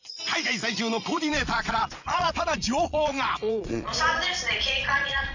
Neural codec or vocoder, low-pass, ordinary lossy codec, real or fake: vocoder, 22.05 kHz, 80 mel bands, Vocos; 7.2 kHz; none; fake